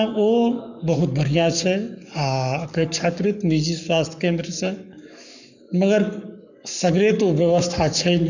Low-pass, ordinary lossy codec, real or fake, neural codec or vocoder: 7.2 kHz; none; fake; codec, 44.1 kHz, 7.8 kbps, Pupu-Codec